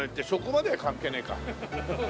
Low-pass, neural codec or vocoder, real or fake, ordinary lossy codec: none; none; real; none